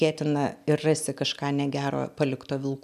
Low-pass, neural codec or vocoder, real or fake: 14.4 kHz; none; real